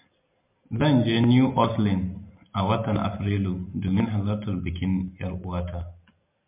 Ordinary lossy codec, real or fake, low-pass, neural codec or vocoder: MP3, 32 kbps; real; 3.6 kHz; none